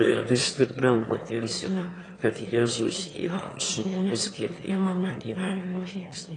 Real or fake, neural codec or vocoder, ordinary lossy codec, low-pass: fake; autoencoder, 22.05 kHz, a latent of 192 numbers a frame, VITS, trained on one speaker; AAC, 32 kbps; 9.9 kHz